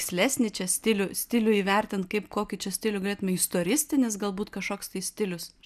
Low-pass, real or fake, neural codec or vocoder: 14.4 kHz; real; none